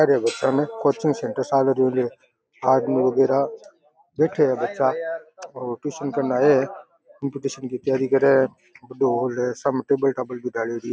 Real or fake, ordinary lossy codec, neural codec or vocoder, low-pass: real; none; none; none